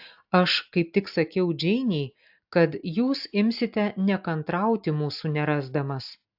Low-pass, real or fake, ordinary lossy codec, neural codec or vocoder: 5.4 kHz; real; AAC, 48 kbps; none